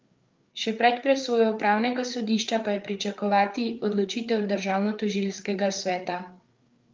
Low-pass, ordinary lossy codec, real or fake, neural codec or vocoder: 7.2 kHz; Opus, 24 kbps; fake; codec, 16 kHz, 4 kbps, FreqCodec, larger model